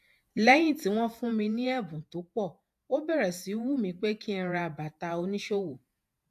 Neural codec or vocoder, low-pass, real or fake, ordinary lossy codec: vocoder, 48 kHz, 128 mel bands, Vocos; 14.4 kHz; fake; AAC, 96 kbps